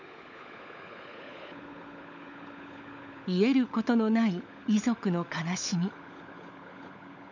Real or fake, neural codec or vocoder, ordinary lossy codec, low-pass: fake; codec, 16 kHz, 16 kbps, FunCodec, trained on LibriTTS, 50 frames a second; none; 7.2 kHz